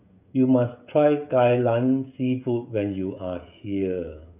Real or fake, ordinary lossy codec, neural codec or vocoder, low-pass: fake; none; codec, 16 kHz, 16 kbps, FreqCodec, smaller model; 3.6 kHz